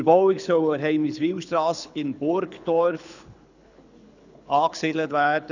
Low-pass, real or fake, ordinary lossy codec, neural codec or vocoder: 7.2 kHz; fake; none; codec, 24 kHz, 6 kbps, HILCodec